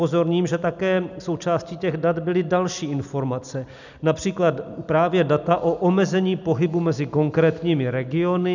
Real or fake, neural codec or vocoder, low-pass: real; none; 7.2 kHz